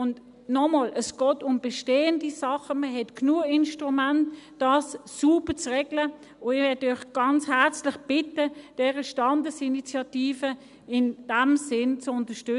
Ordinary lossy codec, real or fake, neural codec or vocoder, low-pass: none; real; none; 10.8 kHz